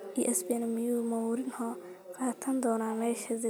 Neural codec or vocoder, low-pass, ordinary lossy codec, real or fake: none; none; none; real